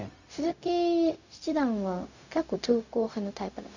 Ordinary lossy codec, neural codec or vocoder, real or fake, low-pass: none; codec, 16 kHz, 0.4 kbps, LongCat-Audio-Codec; fake; 7.2 kHz